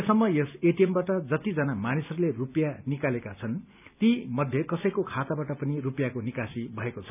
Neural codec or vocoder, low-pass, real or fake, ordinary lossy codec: none; 3.6 kHz; real; none